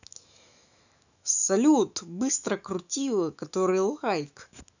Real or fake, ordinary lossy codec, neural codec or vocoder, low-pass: fake; none; autoencoder, 48 kHz, 128 numbers a frame, DAC-VAE, trained on Japanese speech; 7.2 kHz